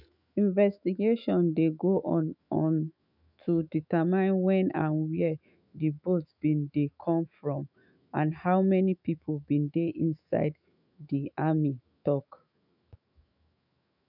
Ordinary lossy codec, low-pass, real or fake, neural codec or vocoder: none; 5.4 kHz; fake; autoencoder, 48 kHz, 128 numbers a frame, DAC-VAE, trained on Japanese speech